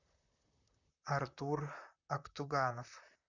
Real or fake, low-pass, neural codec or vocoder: fake; 7.2 kHz; vocoder, 44.1 kHz, 128 mel bands, Pupu-Vocoder